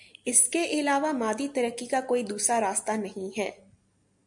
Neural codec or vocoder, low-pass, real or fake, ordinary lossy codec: none; 10.8 kHz; real; MP3, 64 kbps